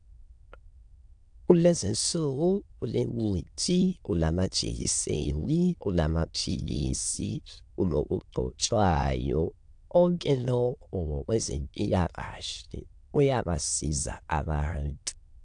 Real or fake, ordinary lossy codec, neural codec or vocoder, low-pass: fake; AAC, 64 kbps; autoencoder, 22.05 kHz, a latent of 192 numbers a frame, VITS, trained on many speakers; 9.9 kHz